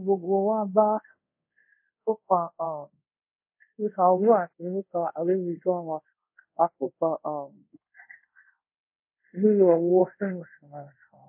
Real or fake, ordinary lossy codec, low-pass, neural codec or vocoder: fake; MP3, 24 kbps; 3.6 kHz; codec, 24 kHz, 0.5 kbps, DualCodec